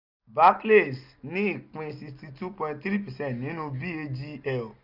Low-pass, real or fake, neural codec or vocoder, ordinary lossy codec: 5.4 kHz; real; none; none